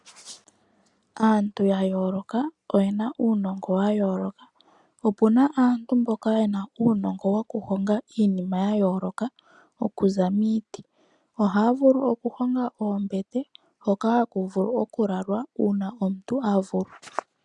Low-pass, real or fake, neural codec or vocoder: 10.8 kHz; real; none